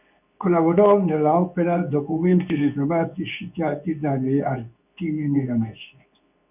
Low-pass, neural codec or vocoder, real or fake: 3.6 kHz; codec, 24 kHz, 0.9 kbps, WavTokenizer, medium speech release version 2; fake